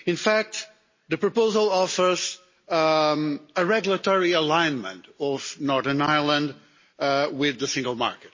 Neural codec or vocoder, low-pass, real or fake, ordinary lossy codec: none; 7.2 kHz; real; MP3, 32 kbps